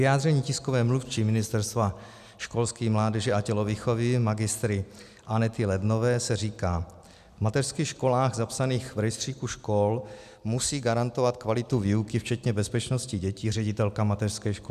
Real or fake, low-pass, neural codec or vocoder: real; 14.4 kHz; none